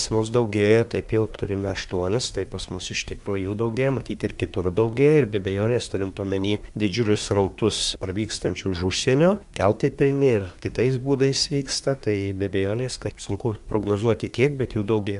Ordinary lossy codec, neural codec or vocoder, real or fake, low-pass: MP3, 96 kbps; codec, 24 kHz, 1 kbps, SNAC; fake; 10.8 kHz